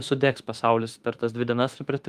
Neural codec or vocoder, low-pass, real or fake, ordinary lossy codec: autoencoder, 48 kHz, 32 numbers a frame, DAC-VAE, trained on Japanese speech; 14.4 kHz; fake; Opus, 32 kbps